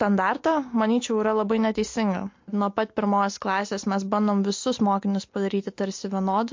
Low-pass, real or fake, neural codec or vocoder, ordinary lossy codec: 7.2 kHz; real; none; MP3, 48 kbps